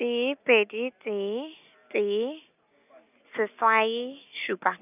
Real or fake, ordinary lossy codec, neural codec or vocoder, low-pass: real; none; none; 3.6 kHz